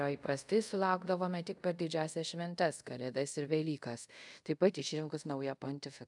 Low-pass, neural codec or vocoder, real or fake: 10.8 kHz; codec, 24 kHz, 0.5 kbps, DualCodec; fake